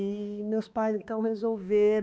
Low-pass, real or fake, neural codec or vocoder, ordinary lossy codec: none; fake; codec, 16 kHz, 2 kbps, X-Codec, HuBERT features, trained on balanced general audio; none